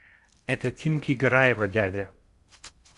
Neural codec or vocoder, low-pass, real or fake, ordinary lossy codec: codec, 16 kHz in and 24 kHz out, 0.8 kbps, FocalCodec, streaming, 65536 codes; 10.8 kHz; fake; Opus, 64 kbps